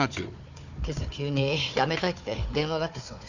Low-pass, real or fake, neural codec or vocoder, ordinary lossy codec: 7.2 kHz; fake; codec, 16 kHz, 4 kbps, FunCodec, trained on Chinese and English, 50 frames a second; none